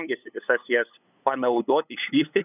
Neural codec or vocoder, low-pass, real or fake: codec, 16 kHz, 8 kbps, FunCodec, trained on LibriTTS, 25 frames a second; 3.6 kHz; fake